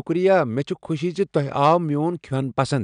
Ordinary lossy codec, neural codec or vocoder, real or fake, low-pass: none; none; real; 9.9 kHz